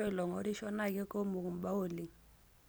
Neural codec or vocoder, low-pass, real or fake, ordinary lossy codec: none; none; real; none